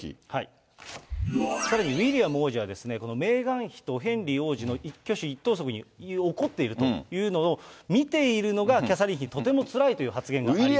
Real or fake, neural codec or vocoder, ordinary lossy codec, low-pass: real; none; none; none